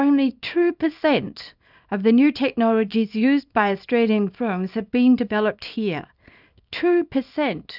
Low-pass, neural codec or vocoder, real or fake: 5.4 kHz; codec, 24 kHz, 0.9 kbps, WavTokenizer, small release; fake